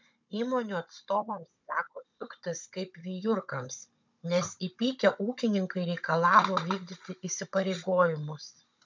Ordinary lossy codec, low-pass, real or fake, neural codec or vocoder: MP3, 64 kbps; 7.2 kHz; fake; codec, 16 kHz, 16 kbps, FunCodec, trained on Chinese and English, 50 frames a second